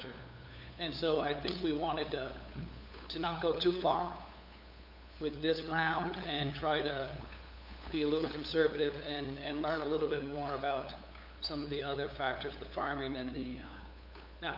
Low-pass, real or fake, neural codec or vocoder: 5.4 kHz; fake; codec, 16 kHz, 8 kbps, FunCodec, trained on LibriTTS, 25 frames a second